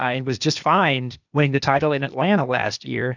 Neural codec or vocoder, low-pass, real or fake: codec, 16 kHz, 0.8 kbps, ZipCodec; 7.2 kHz; fake